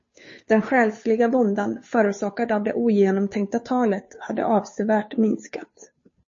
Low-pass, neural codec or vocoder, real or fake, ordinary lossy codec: 7.2 kHz; codec, 16 kHz, 2 kbps, FunCodec, trained on Chinese and English, 25 frames a second; fake; MP3, 32 kbps